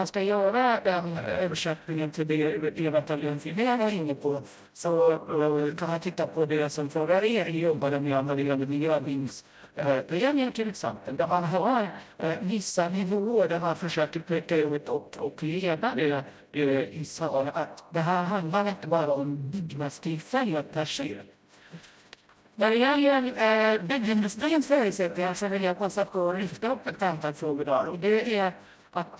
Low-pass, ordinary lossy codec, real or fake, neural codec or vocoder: none; none; fake; codec, 16 kHz, 0.5 kbps, FreqCodec, smaller model